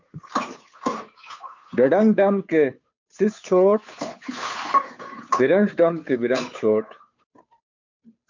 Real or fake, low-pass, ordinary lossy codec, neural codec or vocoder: fake; 7.2 kHz; MP3, 64 kbps; codec, 16 kHz, 2 kbps, FunCodec, trained on Chinese and English, 25 frames a second